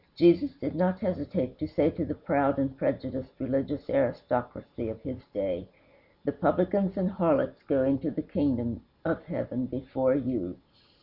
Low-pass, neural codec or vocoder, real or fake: 5.4 kHz; none; real